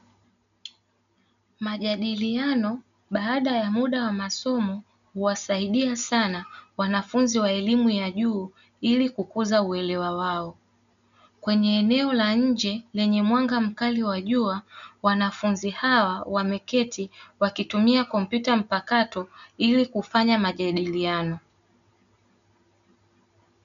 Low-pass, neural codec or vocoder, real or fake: 7.2 kHz; none; real